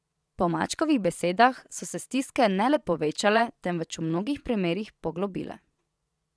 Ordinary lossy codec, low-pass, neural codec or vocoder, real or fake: none; none; vocoder, 22.05 kHz, 80 mel bands, Vocos; fake